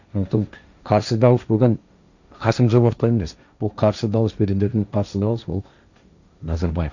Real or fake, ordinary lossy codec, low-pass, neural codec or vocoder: fake; none; 7.2 kHz; codec, 16 kHz, 1.1 kbps, Voila-Tokenizer